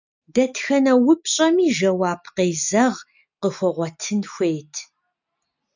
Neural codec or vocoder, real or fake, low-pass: none; real; 7.2 kHz